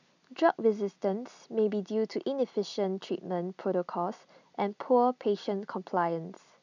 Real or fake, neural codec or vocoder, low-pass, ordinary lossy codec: real; none; 7.2 kHz; none